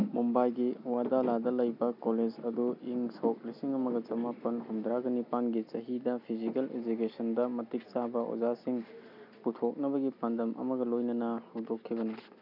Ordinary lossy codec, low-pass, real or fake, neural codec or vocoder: none; 5.4 kHz; real; none